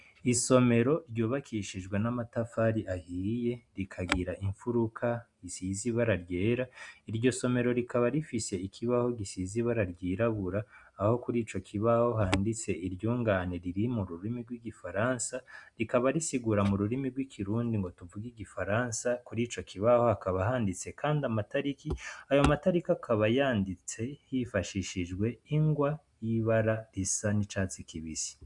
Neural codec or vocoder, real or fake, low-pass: none; real; 10.8 kHz